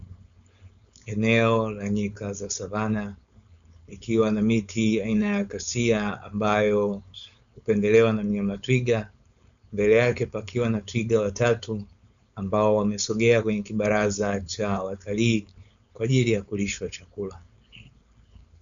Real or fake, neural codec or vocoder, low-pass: fake; codec, 16 kHz, 4.8 kbps, FACodec; 7.2 kHz